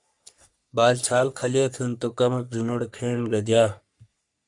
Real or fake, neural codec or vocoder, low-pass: fake; codec, 44.1 kHz, 3.4 kbps, Pupu-Codec; 10.8 kHz